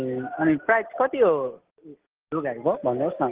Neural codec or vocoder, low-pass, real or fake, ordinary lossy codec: none; 3.6 kHz; real; Opus, 16 kbps